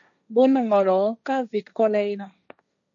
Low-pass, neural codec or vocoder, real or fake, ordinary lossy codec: 7.2 kHz; codec, 16 kHz, 1.1 kbps, Voila-Tokenizer; fake; MP3, 96 kbps